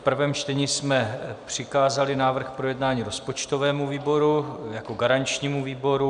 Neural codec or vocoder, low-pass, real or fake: none; 9.9 kHz; real